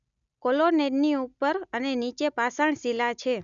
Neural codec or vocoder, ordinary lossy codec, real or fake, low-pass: none; none; real; 7.2 kHz